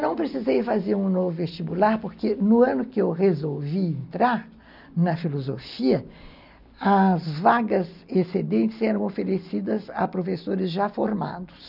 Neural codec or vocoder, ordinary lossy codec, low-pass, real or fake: none; none; 5.4 kHz; real